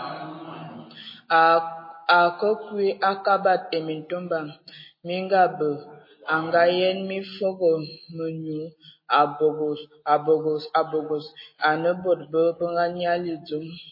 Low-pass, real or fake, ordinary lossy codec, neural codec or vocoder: 5.4 kHz; real; MP3, 24 kbps; none